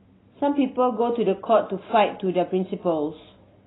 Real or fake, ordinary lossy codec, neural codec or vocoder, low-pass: real; AAC, 16 kbps; none; 7.2 kHz